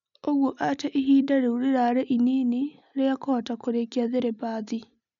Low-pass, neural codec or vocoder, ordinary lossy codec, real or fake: 7.2 kHz; none; none; real